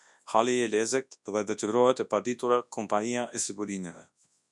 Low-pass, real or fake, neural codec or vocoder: 10.8 kHz; fake; codec, 24 kHz, 0.9 kbps, WavTokenizer, large speech release